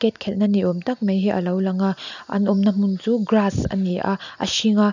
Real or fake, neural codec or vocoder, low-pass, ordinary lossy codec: real; none; 7.2 kHz; none